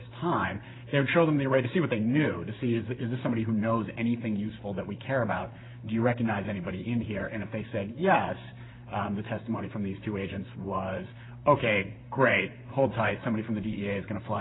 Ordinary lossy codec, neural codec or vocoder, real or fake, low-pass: AAC, 16 kbps; vocoder, 44.1 kHz, 128 mel bands, Pupu-Vocoder; fake; 7.2 kHz